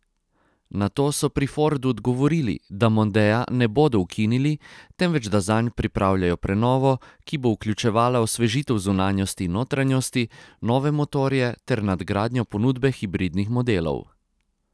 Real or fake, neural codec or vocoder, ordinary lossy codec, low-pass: real; none; none; none